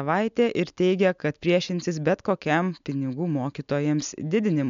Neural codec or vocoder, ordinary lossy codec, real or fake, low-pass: none; MP3, 64 kbps; real; 7.2 kHz